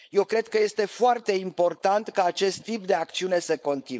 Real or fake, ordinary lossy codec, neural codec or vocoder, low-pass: fake; none; codec, 16 kHz, 4.8 kbps, FACodec; none